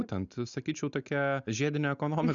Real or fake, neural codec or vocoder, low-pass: real; none; 7.2 kHz